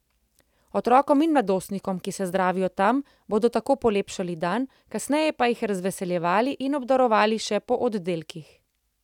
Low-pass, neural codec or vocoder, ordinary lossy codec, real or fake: 19.8 kHz; none; none; real